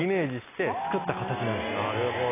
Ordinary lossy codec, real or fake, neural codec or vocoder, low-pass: AAC, 16 kbps; real; none; 3.6 kHz